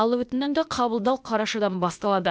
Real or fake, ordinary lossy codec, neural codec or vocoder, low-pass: fake; none; codec, 16 kHz, 0.8 kbps, ZipCodec; none